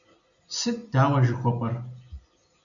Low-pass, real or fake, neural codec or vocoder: 7.2 kHz; real; none